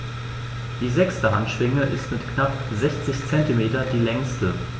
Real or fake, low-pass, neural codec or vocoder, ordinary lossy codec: real; none; none; none